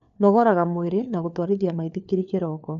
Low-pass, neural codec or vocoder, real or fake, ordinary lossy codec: 7.2 kHz; codec, 16 kHz, 4 kbps, FunCodec, trained on LibriTTS, 50 frames a second; fake; none